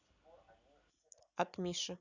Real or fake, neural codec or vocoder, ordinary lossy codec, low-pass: real; none; none; 7.2 kHz